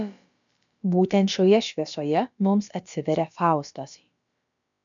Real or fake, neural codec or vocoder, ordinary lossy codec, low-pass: fake; codec, 16 kHz, about 1 kbps, DyCAST, with the encoder's durations; MP3, 96 kbps; 7.2 kHz